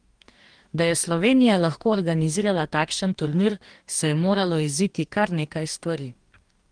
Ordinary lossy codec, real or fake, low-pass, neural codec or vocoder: Opus, 24 kbps; fake; 9.9 kHz; codec, 44.1 kHz, 2.6 kbps, DAC